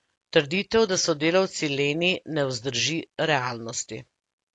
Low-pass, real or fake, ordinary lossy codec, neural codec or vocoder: 10.8 kHz; real; AAC, 48 kbps; none